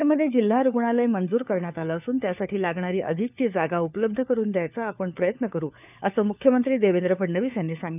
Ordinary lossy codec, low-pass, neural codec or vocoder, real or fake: none; 3.6 kHz; codec, 16 kHz, 4 kbps, FunCodec, trained on LibriTTS, 50 frames a second; fake